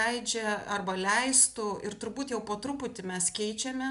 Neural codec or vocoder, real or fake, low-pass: none; real; 10.8 kHz